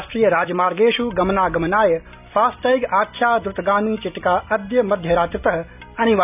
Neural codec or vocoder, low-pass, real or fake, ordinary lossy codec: none; 3.6 kHz; real; none